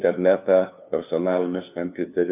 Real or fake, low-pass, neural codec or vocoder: fake; 3.6 kHz; codec, 16 kHz, 2 kbps, FunCodec, trained on LibriTTS, 25 frames a second